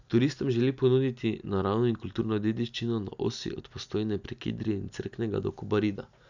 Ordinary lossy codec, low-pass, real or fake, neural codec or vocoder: none; 7.2 kHz; real; none